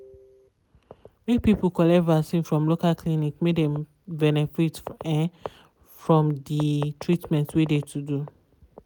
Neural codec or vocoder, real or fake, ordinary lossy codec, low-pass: none; real; none; none